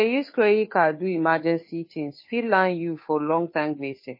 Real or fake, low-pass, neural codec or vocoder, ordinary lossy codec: fake; 5.4 kHz; codec, 16 kHz, 2 kbps, FunCodec, trained on Chinese and English, 25 frames a second; MP3, 24 kbps